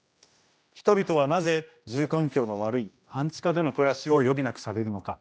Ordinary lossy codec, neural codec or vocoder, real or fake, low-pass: none; codec, 16 kHz, 1 kbps, X-Codec, HuBERT features, trained on general audio; fake; none